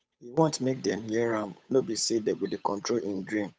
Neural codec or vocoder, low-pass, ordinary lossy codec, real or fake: codec, 16 kHz, 8 kbps, FunCodec, trained on Chinese and English, 25 frames a second; none; none; fake